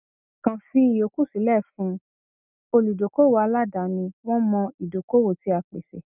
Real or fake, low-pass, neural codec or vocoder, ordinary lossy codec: real; 3.6 kHz; none; none